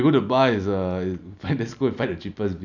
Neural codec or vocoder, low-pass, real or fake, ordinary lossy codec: none; 7.2 kHz; real; none